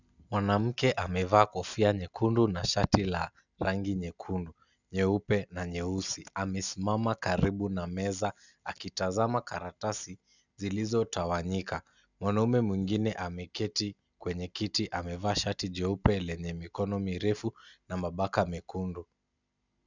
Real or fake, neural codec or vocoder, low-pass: real; none; 7.2 kHz